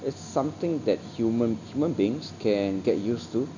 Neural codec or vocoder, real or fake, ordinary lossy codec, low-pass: none; real; none; 7.2 kHz